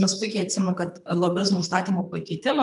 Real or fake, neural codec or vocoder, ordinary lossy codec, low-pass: fake; codec, 24 kHz, 3 kbps, HILCodec; MP3, 96 kbps; 10.8 kHz